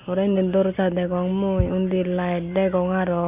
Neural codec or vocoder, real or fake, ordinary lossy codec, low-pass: none; real; Opus, 64 kbps; 3.6 kHz